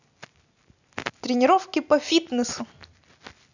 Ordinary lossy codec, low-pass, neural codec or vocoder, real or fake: none; 7.2 kHz; none; real